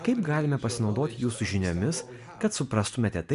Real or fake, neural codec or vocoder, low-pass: real; none; 10.8 kHz